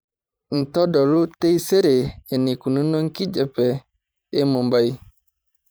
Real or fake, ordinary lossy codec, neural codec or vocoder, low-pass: fake; none; vocoder, 44.1 kHz, 128 mel bands every 512 samples, BigVGAN v2; none